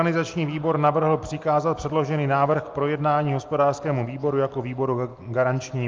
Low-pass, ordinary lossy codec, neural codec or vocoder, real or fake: 7.2 kHz; Opus, 32 kbps; none; real